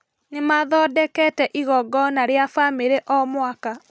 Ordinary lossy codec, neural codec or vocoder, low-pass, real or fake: none; none; none; real